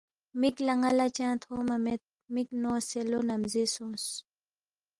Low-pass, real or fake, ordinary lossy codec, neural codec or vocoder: 10.8 kHz; real; Opus, 24 kbps; none